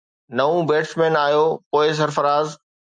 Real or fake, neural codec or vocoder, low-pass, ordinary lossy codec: real; none; 9.9 kHz; MP3, 96 kbps